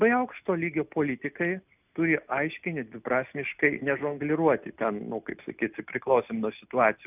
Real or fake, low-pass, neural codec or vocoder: real; 3.6 kHz; none